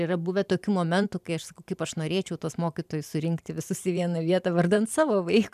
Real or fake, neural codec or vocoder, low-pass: fake; vocoder, 44.1 kHz, 128 mel bands every 512 samples, BigVGAN v2; 14.4 kHz